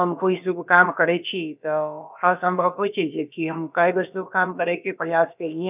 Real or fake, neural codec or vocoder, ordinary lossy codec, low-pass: fake; codec, 16 kHz, about 1 kbps, DyCAST, with the encoder's durations; none; 3.6 kHz